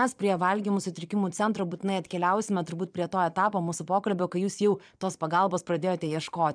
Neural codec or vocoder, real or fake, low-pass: vocoder, 22.05 kHz, 80 mel bands, Vocos; fake; 9.9 kHz